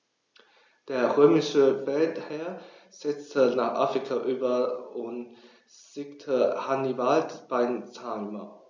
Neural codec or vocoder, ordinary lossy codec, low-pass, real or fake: none; none; none; real